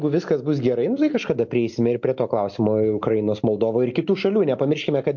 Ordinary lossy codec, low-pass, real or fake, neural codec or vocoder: MP3, 48 kbps; 7.2 kHz; real; none